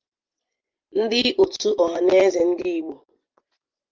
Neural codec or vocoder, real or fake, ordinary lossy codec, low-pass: vocoder, 24 kHz, 100 mel bands, Vocos; fake; Opus, 32 kbps; 7.2 kHz